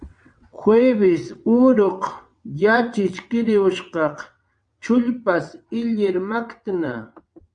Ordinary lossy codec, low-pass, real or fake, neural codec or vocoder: MP3, 96 kbps; 9.9 kHz; fake; vocoder, 22.05 kHz, 80 mel bands, WaveNeXt